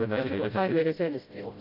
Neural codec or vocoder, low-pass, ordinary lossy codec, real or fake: codec, 16 kHz, 0.5 kbps, FreqCodec, smaller model; 5.4 kHz; none; fake